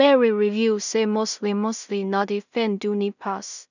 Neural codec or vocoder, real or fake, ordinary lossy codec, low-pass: codec, 16 kHz in and 24 kHz out, 0.4 kbps, LongCat-Audio-Codec, two codebook decoder; fake; none; 7.2 kHz